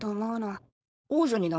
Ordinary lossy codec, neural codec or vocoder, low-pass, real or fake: none; codec, 16 kHz, 4.8 kbps, FACodec; none; fake